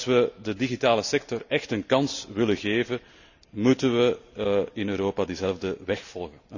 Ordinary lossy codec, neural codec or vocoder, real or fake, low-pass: none; none; real; 7.2 kHz